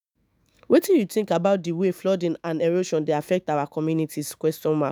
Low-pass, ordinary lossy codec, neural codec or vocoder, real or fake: none; none; autoencoder, 48 kHz, 128 numbers a frame, DAC-VAE, trained on Japanese speech; fake